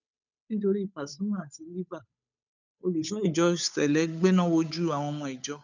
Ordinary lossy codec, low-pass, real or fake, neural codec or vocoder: none; 7.2 kHz; fake; codec, 16 kHz, 8 kbps, FunCodec, trained on Chinese and English, 25 frames a second